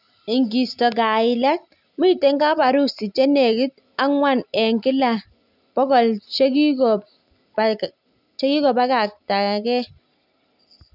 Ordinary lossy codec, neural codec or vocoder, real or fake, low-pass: none; none; real; 5.4 kHz